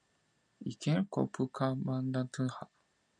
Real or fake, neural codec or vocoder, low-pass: real; none; 9.9 kHz